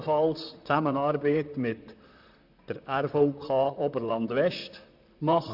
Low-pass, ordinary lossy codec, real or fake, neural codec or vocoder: 5.4 kHz; none; fake; vocoder, 44.1 kHz, 128 mel bands, Pupu-Vocoder